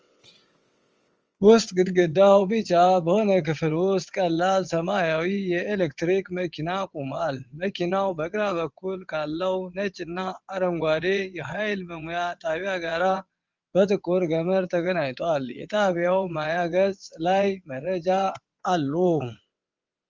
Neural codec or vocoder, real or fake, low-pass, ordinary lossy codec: vocoder, 22.05 kHz, 80 mel bands, WaveNeXt; fake; 7.2 kHz; Opus, 24 kbps